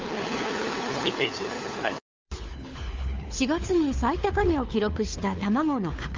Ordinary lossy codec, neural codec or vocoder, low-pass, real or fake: Opus, 32 kbps; codec, 16 kHz, 4 kbps, FunCodec, trained on LibriTTS, 50 frames a second; 7.2 kHz; fake